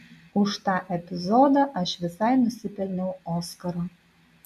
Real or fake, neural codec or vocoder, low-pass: real; none; 14.4 kHz